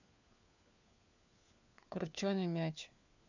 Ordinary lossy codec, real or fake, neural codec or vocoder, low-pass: none; fake; codec, 16 kHz, 4 kbps, FunCodec, trained on LibriTTS, 50 frames a second; 7.2 kHz